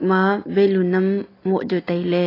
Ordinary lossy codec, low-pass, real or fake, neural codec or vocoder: AAC, 24 kbps; 5.4 kHz; real; none